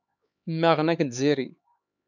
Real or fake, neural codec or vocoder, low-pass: fake; codec, 16 kHz, 4 kbps, X-Codec, HuBERT features, trained on LibriSpeech; 7.2 kHz